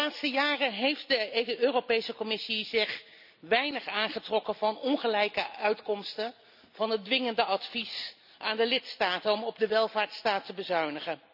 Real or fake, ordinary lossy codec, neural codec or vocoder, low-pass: real; none; none; 5.4 kHz